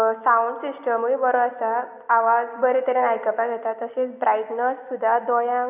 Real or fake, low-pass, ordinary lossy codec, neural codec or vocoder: real; 3.6 kHz; none; none